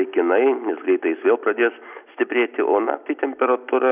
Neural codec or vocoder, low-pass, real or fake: none; 3.6 kHz; real